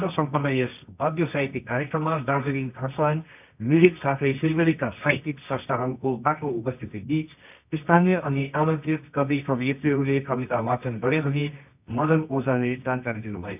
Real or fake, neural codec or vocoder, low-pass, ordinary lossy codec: fake; codec, 24 kHz, 0.9 kbps, WavTokenizer, medium music audio release; 3.6 kHz; none